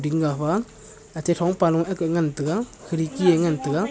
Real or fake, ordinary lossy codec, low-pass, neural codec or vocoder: real; none; none; none